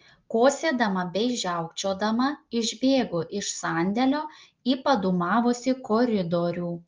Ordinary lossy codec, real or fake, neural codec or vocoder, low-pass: Opus, 32 kbps; real; none; 7.2 kHz